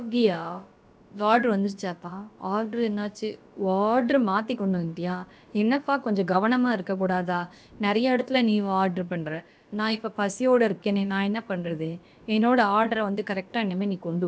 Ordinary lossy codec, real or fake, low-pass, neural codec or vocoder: none; fake; none; codec, 16 kHz, about 1 kbps, DyCAST, with the encoder's durations